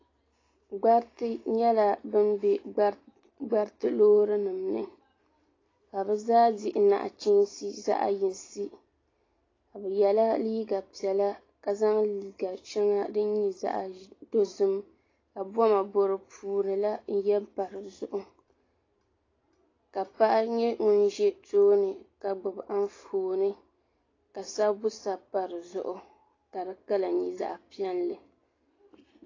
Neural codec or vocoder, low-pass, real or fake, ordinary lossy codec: none; 7.2 kHz; real; AAC, 32 kbps